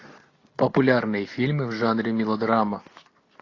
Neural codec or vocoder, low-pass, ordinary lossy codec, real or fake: none; 7.2 kHz; AAC, 32 kbps; real